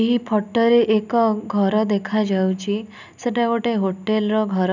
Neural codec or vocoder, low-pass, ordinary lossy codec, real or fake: none; 7.2 kHz; none; real